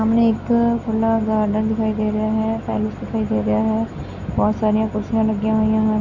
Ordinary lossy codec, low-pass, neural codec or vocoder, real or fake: none; 7.2 kHz; none; real